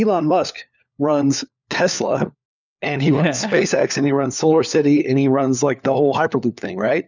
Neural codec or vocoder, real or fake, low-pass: codec, 16 kHz, 4 kbps, FunCodec, trained on LibriTTS, 50 frames a second; fake; 7.2 kHz